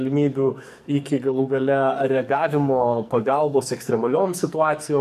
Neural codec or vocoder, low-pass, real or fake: codec, 44.1 kHz, 2.6 kbps, SNAC; 14.4 kHz; fake